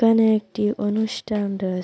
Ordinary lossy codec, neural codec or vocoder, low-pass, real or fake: none; none; none; real